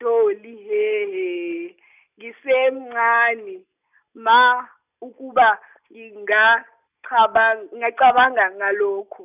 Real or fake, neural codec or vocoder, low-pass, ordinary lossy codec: real; none; 3.6 kHz; none